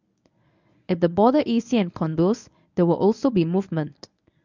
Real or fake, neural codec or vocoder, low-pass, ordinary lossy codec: fake; codec, 24 kHz, 0.9 kbps, WavTokenizer, medium speech release version 1; 7.2 kHz; none